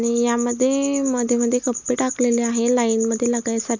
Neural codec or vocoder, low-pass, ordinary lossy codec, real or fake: none; 7.2 kHz; none; real